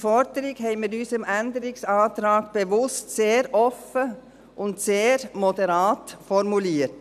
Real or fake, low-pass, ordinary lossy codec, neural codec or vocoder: real; 14.4 kHz; none; none